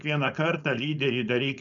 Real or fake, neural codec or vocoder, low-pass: fake; codec, 16 kHz, 4.8 kbps, FACodec; 7.2 kHz